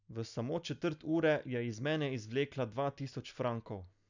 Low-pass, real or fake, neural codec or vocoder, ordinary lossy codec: 7.2 kHz; real; none; none